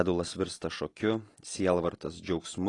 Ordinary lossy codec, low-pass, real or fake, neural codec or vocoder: AAC, 32 kbps; 10.8 kHz; real; none